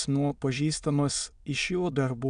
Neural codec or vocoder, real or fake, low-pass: autoencoder, 22.05 kHz, a latent of 192 numbers a frame, VITS, trained on many speakers; fake; 9.9 kHz